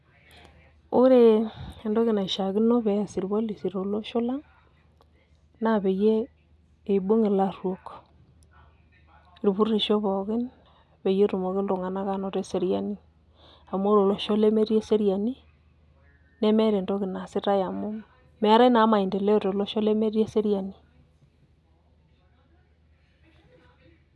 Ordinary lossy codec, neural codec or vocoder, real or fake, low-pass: none; none; real; none